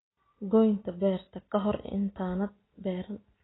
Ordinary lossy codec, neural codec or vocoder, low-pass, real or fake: AAC, 16 kbps; none; 7.2 kHz; real